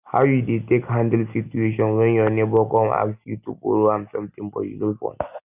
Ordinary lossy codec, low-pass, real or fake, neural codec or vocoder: none; 3.6 kHz; real; none